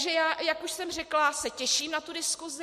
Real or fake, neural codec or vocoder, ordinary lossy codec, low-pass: real; none; MP3, 64 kbps; 14.4 kHz